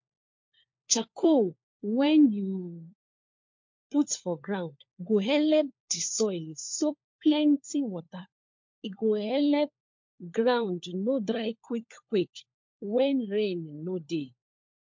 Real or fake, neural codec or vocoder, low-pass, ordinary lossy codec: fake; codec, 16 kHz, 4 kbps, FunCodec, trained on LibriTTS, 50 frames a second; 7.2 kHz; MP3, 48 kbps